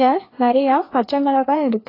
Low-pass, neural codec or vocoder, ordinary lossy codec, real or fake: 5.4 kHz; codec, 16 kHz, 2 kbps, FreqCodec, larger model; AAC, 24 kbps; fake